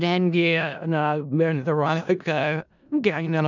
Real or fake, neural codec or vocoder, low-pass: fake; codec, 16 kHz in and 24 kHz out, 0.4 kbps, LongCat-Audio-Codec, four codebook decoder; 7.2 kHz